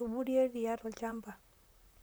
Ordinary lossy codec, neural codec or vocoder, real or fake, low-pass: none; vocoder, 44.1 kHz, 128 mel bands, Pupu-Vocoder; fake; none